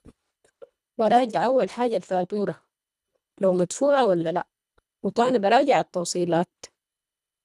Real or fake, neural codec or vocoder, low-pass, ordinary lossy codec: fake; codec, 24 kHz, 1.5 kbps, HILCodec; none; none